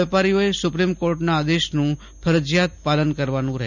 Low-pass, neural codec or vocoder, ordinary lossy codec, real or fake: 7.2 kHz; none; none; real